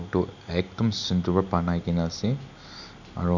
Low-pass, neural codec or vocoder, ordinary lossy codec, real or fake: 7.2 kHz; none; none; real